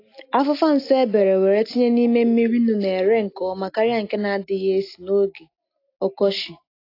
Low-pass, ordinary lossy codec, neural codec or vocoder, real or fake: 5.4 kHz; AAC, 32 kbps; none; real